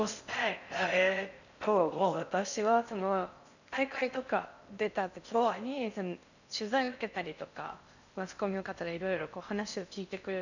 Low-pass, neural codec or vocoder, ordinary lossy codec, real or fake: 7.2 kHz; codec, 16 kHz in and 24 kHz out, 0.6 kbps, FocalCodec, streaming, 4096 codes; none; fake